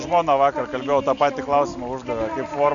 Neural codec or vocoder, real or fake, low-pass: none; real; 7.2 kHz